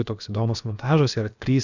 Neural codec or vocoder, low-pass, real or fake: codec, 16 kHz, about 1 kbps, DyCAST, with the encoder's durations; 7.2 kHz; fake